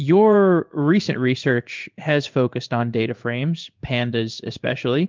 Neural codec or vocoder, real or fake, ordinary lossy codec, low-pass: codec, 16 kHz in and 24 kHz out, 1 kbps, XY-Tokenizer; fake; Opus, 24 kbps; 7.2 kHz